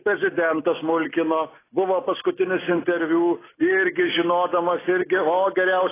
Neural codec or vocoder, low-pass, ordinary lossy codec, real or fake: none; 3.6 kHz; AAC, 16 kbps; real